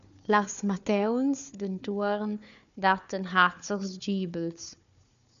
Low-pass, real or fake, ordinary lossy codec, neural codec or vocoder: 7.2 kHz; fake; MP3, 96 kbps; codec, 16 kHz, 4 kbps, FunCodec, trained on Chinese and English, 50 frames a second